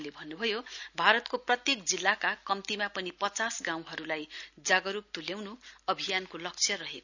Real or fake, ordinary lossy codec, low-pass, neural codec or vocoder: real; none; 7.2 kHz; none